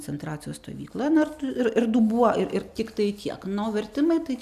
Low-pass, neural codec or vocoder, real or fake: 14.4 kHz; none; real